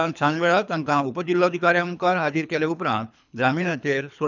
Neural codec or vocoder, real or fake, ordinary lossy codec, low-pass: codec, 24 kHz, 3 kbps, HILCodec; fake; none; 7.2 kHz